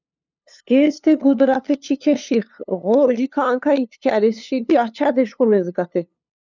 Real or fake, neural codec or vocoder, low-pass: fake; codec, 16 kHz, 2 kbps, FunCodec, trained on LibriTTS, 25 frames a second; 7.2 kHz